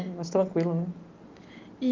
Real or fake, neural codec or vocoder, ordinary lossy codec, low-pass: real; none; Opus, 32 kbps; 7.2 kHz